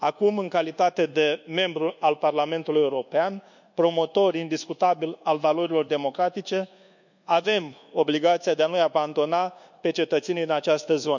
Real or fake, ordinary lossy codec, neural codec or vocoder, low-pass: fake; none; codec, 24 kHz, 1.2 kbps, DualCodec; 7.2 kHz